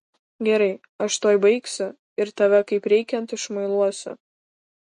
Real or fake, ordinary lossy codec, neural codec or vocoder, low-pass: real; MP3, 48 kbps; none; 14.4 kHz